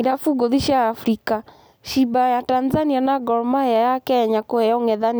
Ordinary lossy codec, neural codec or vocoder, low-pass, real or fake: none; none; none; real